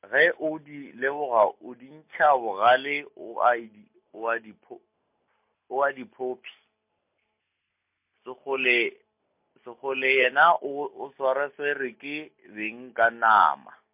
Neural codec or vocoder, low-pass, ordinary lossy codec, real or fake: none; 3.6 kHz; MP3, 32 kbps; real